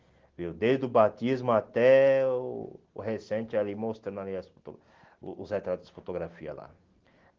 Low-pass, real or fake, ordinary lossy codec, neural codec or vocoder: 7.2 kHz; real; Opus, 16 kbps; none